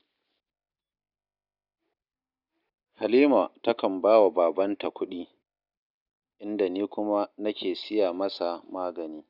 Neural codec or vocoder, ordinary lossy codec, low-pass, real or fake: none; none; 5.4 kHz; real